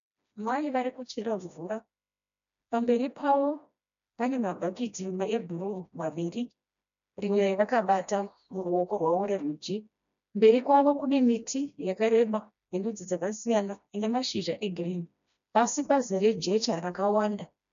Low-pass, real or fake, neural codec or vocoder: 7.2 kHz; fake; codec, 16 kHz, 1 kbps, FreqCodec, smaller model